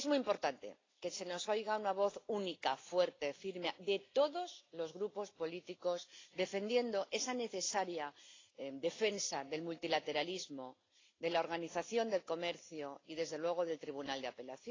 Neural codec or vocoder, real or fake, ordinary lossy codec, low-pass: none; real; AAC, 32 kbps; 7.2 kHz